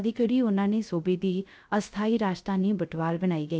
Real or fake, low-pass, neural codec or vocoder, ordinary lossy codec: fake; none; codec, 16 kHz, 0.3 kbps, FocalCodec; none